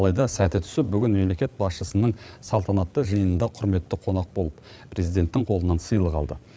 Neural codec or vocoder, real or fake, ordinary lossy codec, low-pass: codec, 16 kHz, 8 kbps, FreqCodec, larger model; fake; none; none